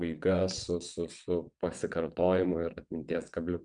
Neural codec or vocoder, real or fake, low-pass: vocoder, 22.05 kHz, 80 mel bands, WaveNeXt; fake; 9.9 kHz